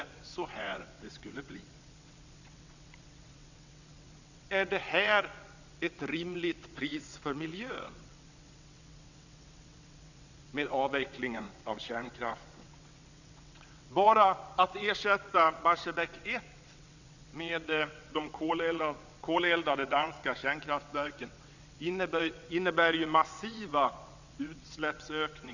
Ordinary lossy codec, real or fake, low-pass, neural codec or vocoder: none; fake; 7.2 kHz; vocoder, 22.05 kHz, 80 mel bands, WaveNeXt